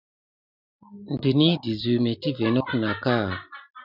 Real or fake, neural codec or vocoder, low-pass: real; none; 5.4 kHz